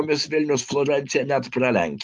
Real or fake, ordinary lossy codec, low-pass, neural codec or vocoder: real; Opus, 32 kbps; 10.8 kHz; none